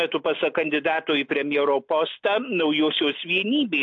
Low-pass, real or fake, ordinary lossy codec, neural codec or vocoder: 7.2 kHz; real; AAC, 48 kbps; none